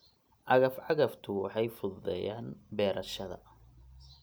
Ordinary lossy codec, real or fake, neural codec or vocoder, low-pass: none; real; none; none